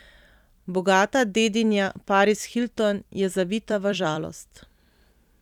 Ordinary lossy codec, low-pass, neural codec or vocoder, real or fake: none; 19.8 kHz; vocoder, 44.1 kHz, 128 mel bands every 256 samples, BigVGAN v2; fake